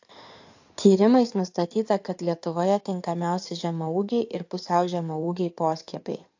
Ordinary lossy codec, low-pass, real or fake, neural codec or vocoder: AAC, 48 kbps; 7.2 kHz; fake; codec, 16 kHz in and 24 kHz out, 2.2 kbps, FireRedTTS-2 codec